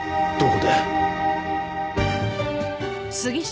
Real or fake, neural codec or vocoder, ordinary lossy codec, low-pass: real; none; none; none